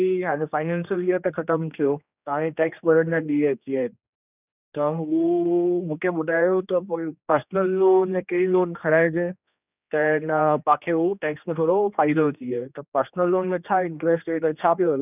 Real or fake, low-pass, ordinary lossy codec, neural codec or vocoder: fake; 3.6 kHz; none; codec, 16 kHz, 2 kbps, X-Codec, HuBERT features, trained on general audio